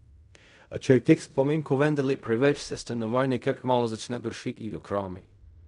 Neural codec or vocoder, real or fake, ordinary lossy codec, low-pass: codec, 16 kHz in and 24 kHz out, 0.4 kbps, LongCat-Audio-Codec, fine tuned four codebook decoder; fake; none; 10.8 kHz